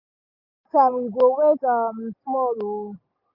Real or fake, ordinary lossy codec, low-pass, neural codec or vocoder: real; none; 5.4 kHz; none